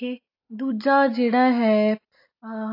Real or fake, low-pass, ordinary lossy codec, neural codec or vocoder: real; 5.4 kHz; AAC, 32 kbps; none